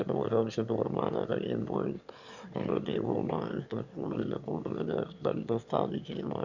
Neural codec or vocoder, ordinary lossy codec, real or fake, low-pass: autoencoder, 22.05 kHz, a latent of 192 numbers a frame, VITS, trained on one speaker; none; fake; 7.2 kHz